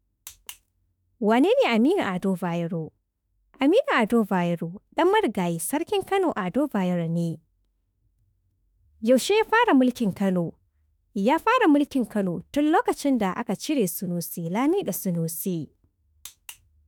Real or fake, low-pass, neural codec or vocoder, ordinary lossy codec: fake; none; autoencoder, 48 kHz, 32 numbers a frame, DAC-VAE, trained on Japanese speech; none